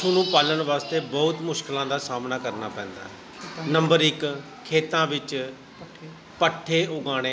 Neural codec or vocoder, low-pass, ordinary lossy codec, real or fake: none; none; none; real